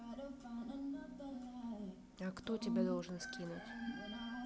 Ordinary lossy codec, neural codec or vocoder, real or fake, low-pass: none; none; real; none